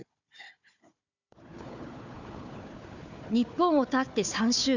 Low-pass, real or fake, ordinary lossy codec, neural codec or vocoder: 7.2 kHz; fake; none; codec, 16 kHz, 4 kbps, FunCodec, trained on Chinese and English, 50 frames a second